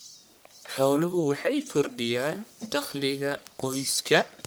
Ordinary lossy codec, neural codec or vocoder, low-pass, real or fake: none; codec, 44.1 kHz, 1.7 kbps, Pupu-Codec; none; fake